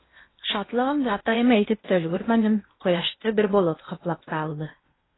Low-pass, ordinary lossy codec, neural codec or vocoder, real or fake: 7.2 kHz; AAC, 16 kbps; codec, 16 kHz in and 24 kHz out, 0.8 kbps, FocalCodec, streaming, 65536 codes; fake